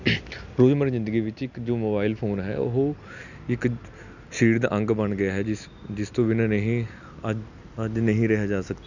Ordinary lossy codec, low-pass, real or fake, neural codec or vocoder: none; 7.2 kHz; real; none